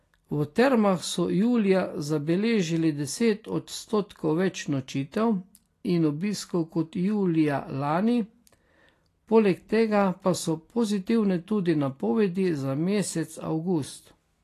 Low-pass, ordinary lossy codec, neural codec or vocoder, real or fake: 14.4 kHz; AAC, 48 kbps; none; real